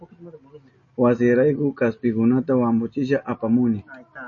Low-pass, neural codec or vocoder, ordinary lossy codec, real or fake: 7.2 kHz; none; MP3, 32 kbps; real